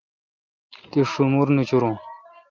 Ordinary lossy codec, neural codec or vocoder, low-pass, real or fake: Opus, 24 kbps; none; 7.2 kHz; real